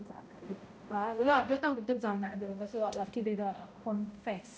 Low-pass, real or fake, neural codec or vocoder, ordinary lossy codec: none; fake; codec, 16 kHz, 0.5 kbps, X-Codec, HuBERT features, trained on balanced general audio; none